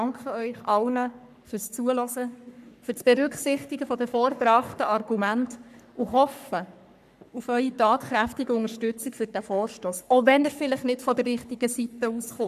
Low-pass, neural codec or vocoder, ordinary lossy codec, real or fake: 14.4 kHz; codec, 44.1 kHz, 3.4 kbps, Pupu-Codec; none; fake